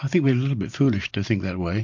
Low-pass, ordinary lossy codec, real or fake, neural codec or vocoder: 7.2 kHz; MP3, 48 kbps; fake; vocoder, 44.1 kHz, 128 mel bands every 512 samples, BigVGAN v2